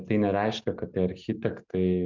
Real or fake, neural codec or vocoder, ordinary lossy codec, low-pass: real; none; MP3, 64 kbps; 7.2 kHz